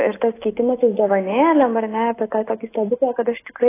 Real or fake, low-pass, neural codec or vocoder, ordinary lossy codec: real; 3.6 kHz; none; AAC, 16 kbps